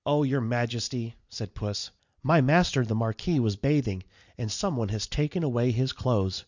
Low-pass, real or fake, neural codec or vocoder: 7.2 kHz; real; none